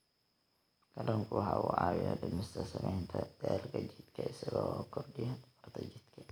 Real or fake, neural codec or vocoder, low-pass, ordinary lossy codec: real; none; none; none